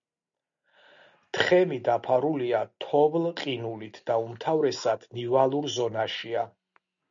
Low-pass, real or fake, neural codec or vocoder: 7.2 kHz; real; none